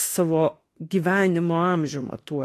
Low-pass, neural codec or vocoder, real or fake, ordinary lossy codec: 14.4 kHz; autoencoder, 48 kHz, 32 numbers a frame, DAC-VAE, trained on Japanese speech; fake; AAC, 48 kbps